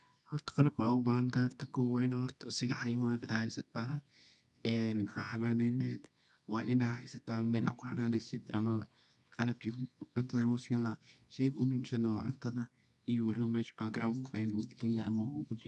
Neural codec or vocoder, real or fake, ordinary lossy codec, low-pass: codec, 24 kHz, 0.9 kbps, WavTokenizer, medium music audio release; fake; none; 10.8 kHz